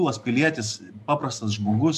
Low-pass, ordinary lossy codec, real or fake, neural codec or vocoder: 14.4 kHz; AAC, 96 kbps; real; none